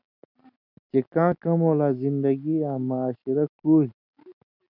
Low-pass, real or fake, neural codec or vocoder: 5.4 kHz; real; none